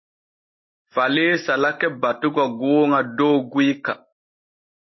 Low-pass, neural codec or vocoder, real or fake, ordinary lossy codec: 7.2 kHz; none; real; MP3, 24 kbps